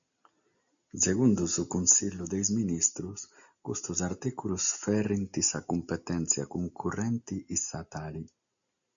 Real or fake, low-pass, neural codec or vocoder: real; 7.2 kHz; none